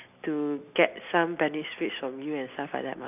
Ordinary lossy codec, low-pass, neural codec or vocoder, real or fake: none; 3.6 kHz; none; real